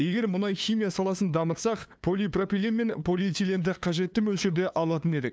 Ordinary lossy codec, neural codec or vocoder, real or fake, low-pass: none; codec, 16 kHz, 2 kbps, FunCodec, trained on LibriTTS, 25 frames a second; fake; none